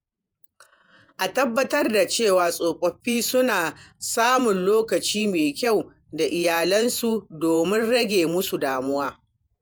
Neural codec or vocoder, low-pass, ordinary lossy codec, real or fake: vocoder, 48 kHz, 128 mel bands, Vocos; none; none; fake